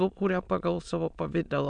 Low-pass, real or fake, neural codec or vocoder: 9.9 kHz; fake; autoencoder, 22.05 kHz, a latent of 192 numbers a frame, VITS, trained on many speakers